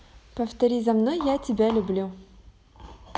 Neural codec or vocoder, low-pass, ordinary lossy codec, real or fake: none; none; none; real